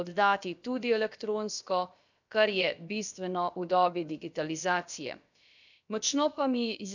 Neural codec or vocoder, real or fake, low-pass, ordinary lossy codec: codec, 16 kHz, about 1 kbps, DyCAST, with the encoder's durations; fake; 7.2 kHz; none